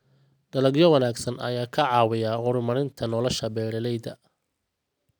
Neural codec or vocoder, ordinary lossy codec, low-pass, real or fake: none; none; none; real